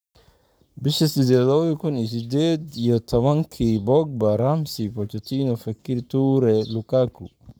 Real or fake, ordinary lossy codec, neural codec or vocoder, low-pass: fake; none; codec, 44.1 kHz, 7.8 kbps, Pupu-Codec; none